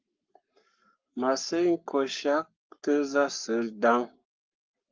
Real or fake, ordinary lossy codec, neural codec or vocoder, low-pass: fake; Opus, 24 kbps; codec, 44.1 kHz, 7.8 kbps, Pupu-Codec; 7.2 kHz